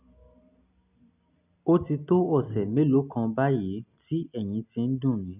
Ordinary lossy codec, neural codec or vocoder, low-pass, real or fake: none; none; 3.6 kHz; real